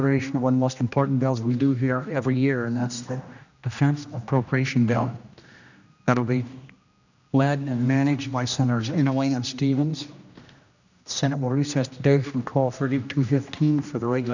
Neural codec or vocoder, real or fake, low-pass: codec, 16 kHz, 1 kbps, X-Codec, HuBERT features, trained on general audio; fake; 7.2 kHz